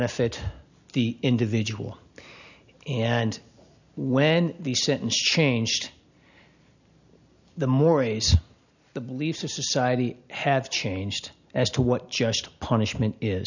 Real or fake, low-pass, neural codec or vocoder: real; 7.2 kHz; none